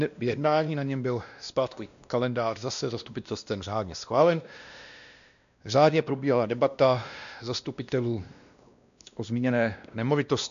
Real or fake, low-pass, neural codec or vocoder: fake; 7.2 kHz; codec, 16 kHz, 1 kbps, X-Codec, WavLM features, trained on Multilingual LibriSpeech